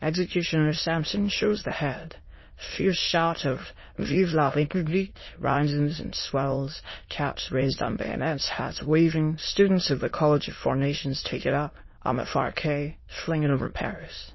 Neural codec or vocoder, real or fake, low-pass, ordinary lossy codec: autoencoder, 22.05 kHz, a latent of 192 numbers a frame, VITS, trained on many speakers; fake; 7.2 kHz; MP3, 24 kbps